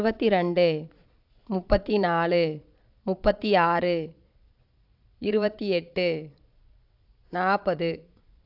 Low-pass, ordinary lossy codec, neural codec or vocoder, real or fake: 5.4 kHz; AAC, 48 kbps; vocoder, 22.05 kHz, 80 mel bands, Vocos; fake